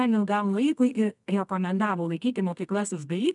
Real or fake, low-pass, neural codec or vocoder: fake; 10.8 kHz; codec, 24 kHz, 0.9 kbps, WavTokenizer, medium music audio release